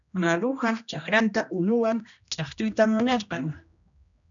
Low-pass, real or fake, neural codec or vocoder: 7.2 kHz; fake; codec, 16 kHz, 1 kbps, X-Codec, HuBERT features, trained on general audio